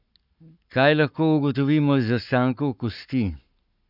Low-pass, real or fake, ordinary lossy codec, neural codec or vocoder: 5.4 kHz; fake; none; codec, 44.1 kHz, 7.8 kbps, Pupu-Codec